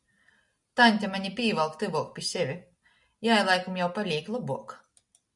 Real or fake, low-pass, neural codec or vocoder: real; 10.8 kHz; none